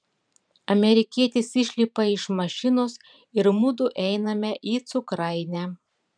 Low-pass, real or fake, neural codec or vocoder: 9.9 kHz; fake; vocoder, 44.1 kHz, 128 mel bands every 512 samples, BigVGAN v2